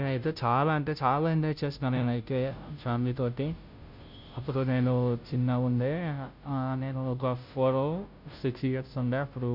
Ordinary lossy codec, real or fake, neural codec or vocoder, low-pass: none; fake; codec, 16 kHz, 0.5 kbps, FunCodec, trained on Chinese and English, 25 frames a second; 5.4 kHz